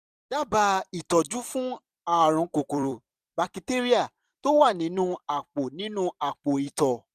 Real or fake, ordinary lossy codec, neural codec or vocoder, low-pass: fake; none; vocoder, 44.1 kHz, 128 mel bands every 256 samples, BigVGAN v2; 14.4 kHz